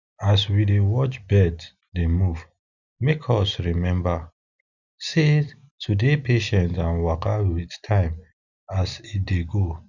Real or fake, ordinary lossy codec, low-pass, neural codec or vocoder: real; none; 7.2 kHz; none